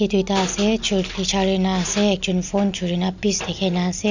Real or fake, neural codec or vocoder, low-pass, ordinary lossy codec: real; none; 7.2 kHz; none